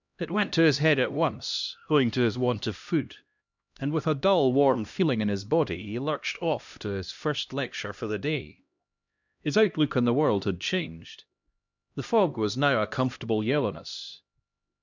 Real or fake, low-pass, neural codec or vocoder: fake; 7.2 kHz; codec, 16 kHz, 1 kbps, X-Codec, HuBERT features, trained on LibriSpeech